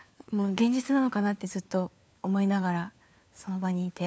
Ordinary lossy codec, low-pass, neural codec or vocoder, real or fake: none; none; codec, 16 kHz, 4 kbps, FunCodec, trained on LibriTTS, 50 frames a second; fake